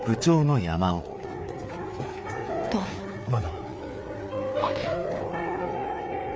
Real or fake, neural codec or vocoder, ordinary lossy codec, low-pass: fake; codec, 16 kHz, 4 kbps, FreqCodec, larger model; none; none